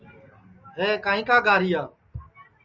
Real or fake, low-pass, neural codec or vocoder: real; 7.2 kHz; none